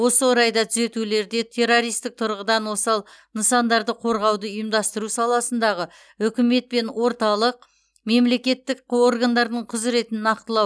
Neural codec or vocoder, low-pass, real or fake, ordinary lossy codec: none; none; real; none